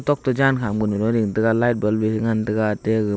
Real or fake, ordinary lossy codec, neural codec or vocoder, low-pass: real; none; none; none